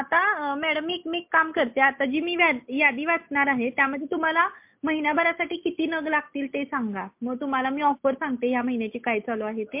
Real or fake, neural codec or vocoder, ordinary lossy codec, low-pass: real; none; MP3, 32 kbps; 3.6 kHz